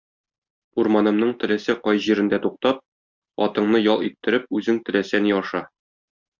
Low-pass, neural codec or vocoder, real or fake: 7.2 kHz; none; real